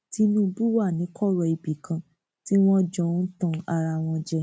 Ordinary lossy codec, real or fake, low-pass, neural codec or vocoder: none; real; none; none